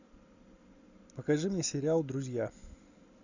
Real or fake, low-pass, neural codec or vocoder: real; 7.2 kHz; none